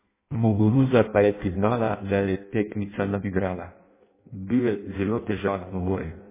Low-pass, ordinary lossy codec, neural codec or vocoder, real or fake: 3.6 kHz; MP3, 16 kbps; codec, 16 kHz in and 24 kHz out, 0.6 kbps, FireRedTTS-2 codec; fake